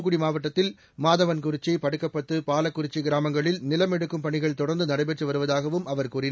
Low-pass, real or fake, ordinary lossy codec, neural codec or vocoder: none; real; none; none